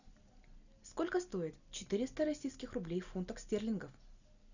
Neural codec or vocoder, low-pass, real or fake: none; 7.2 kHz; real